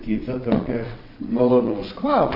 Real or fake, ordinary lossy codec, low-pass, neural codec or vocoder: fake; MP3, 48 kbps; 5.4 kHz; vocoder, 22.05 kHz, 80 mel bands, WaveNeXt